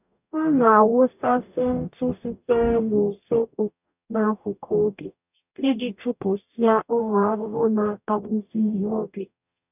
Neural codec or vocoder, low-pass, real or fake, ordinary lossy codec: codec, 44.1 kHz, 0.9 kbps, DAC; 3.6 kHz; fake; none